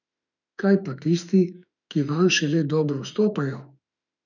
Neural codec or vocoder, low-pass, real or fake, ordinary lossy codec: autoencoder, 48 kHz, 32 numbers a frame, DAC-VAE, trained on Japanese speech; 7.2 kHz; fake; none